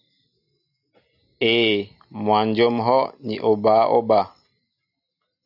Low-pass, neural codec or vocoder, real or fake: 5.4 kHz; none; real